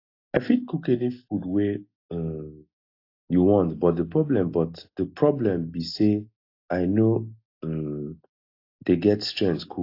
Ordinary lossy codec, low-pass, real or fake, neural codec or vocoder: AAC, 32 kbps; 5.4 kHz; real; none